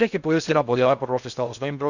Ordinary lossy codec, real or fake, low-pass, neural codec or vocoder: none; fake; 7.2 kHz; codec, 16 kHz in and 24 kHz out, 0.6 kbps, FocalCodec, streaming, 2048 codes